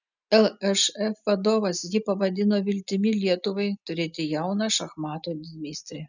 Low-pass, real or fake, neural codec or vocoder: 7.2 kHz; real; none